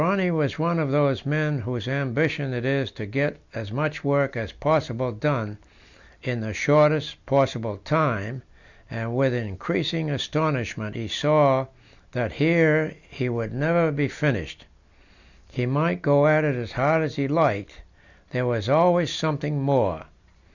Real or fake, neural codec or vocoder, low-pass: real; none; 7.2 kHz